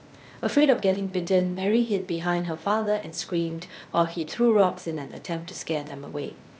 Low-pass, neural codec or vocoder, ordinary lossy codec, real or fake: none; codec, 16 kHz, 0.8 kbps, ZipCodec; none; fake